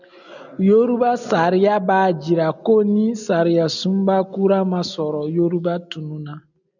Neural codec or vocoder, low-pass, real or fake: none; 7.2 kHz; real